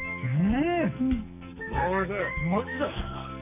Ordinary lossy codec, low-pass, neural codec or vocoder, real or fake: none; 3.6 kHz; codec, 44.1 kHz, 2.6 kbps, SNAC; fake